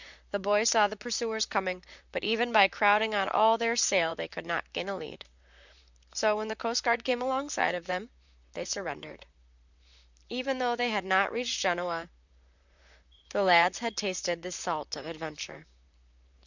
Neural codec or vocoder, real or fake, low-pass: vocoder, 44.1 kHz, 128 mel bands, Pupu-Vocoder; fake; 7.2 kHz